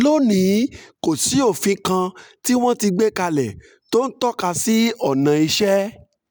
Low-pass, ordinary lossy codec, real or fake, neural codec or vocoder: none; none; real; none